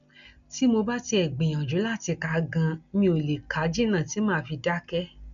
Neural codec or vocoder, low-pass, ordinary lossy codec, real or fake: none; 7.2 kHz; none; real